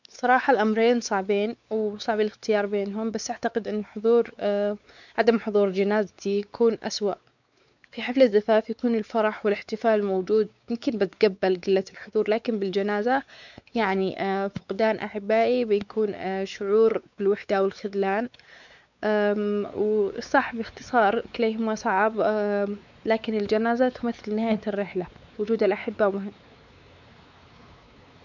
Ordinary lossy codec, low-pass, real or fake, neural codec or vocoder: none; 7.2 kHz; fake; codec, 16 kHz, 4 kbps, X-Codec, WavLM features, trained on Multilingual LibriSpeech